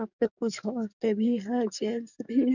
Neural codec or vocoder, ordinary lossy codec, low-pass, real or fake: codec, 44.1 kHz, 7.8 kbps, DAC; none; 7.2 kHz; fake